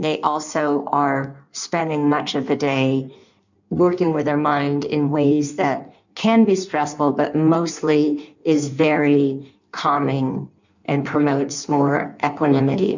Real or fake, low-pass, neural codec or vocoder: fake; 7.2 kHz; codec, 16 kHz in and 24 kHz out, 1.1 kbps, FireRedTTS-2 codec